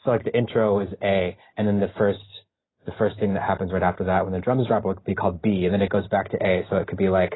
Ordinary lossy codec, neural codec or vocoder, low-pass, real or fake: AAC, 16 kbps; none; 7.2 kHz; real